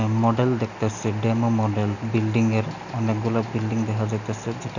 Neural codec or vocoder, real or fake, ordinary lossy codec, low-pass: none; real; none; 7.2 kHz